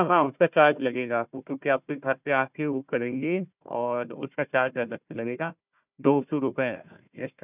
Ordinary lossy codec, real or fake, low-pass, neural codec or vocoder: none; fake; 3.6 kHz; codec, 16 kHz, 1 kbps, FunCodec, trained on Chinese and English, 50 frames a second